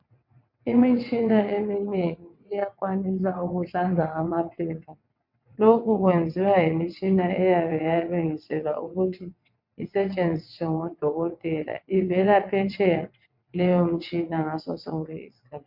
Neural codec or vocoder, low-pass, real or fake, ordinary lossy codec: vocoder, 22.05 kHz, 80 mel bands, WaveNeXt; 5.4 kHz; fake; MP3, 48 kbps